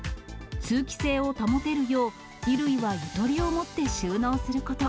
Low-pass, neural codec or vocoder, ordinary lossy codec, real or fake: none; none; none; real